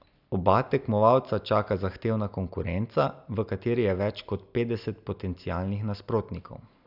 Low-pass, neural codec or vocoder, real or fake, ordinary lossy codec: 5.4 kHz; none; real; none